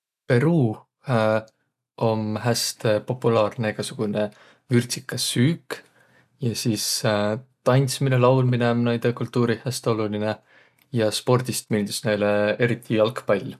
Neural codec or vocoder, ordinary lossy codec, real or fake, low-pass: none; none; real; 14.4 kHz